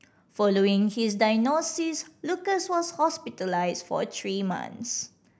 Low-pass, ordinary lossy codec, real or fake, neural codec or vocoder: none; none; real; none